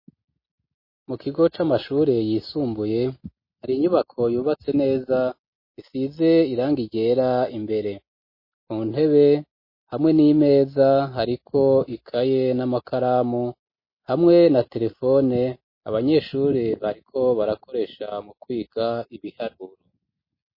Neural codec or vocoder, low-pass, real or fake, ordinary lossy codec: none; 5.4 kHz; real; MP3, 24 kbps